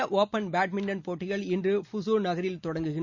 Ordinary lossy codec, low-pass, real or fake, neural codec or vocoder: Opus, 64 kbps; 7.2 kHz; real; none